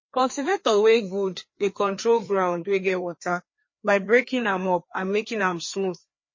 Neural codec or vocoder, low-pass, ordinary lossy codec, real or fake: codec, 16 kHz, 2 kbps, FreqCodec, larger model; 7.2 kHz; MP3, 32 kbps; fake